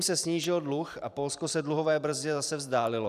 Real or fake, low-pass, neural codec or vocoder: fake; 14.4 kHz; vocoder, 44.1 kHz, 128 mel bands every 512 samples, BigVGAN v2